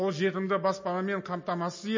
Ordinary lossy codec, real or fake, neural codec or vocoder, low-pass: MP3, 32 kbps; real; none; 7.2 kHz